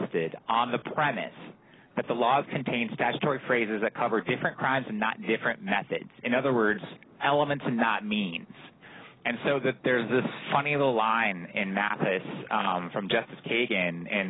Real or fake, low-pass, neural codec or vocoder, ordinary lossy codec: real; 7.2 kHz; none; AAC, 16 kbps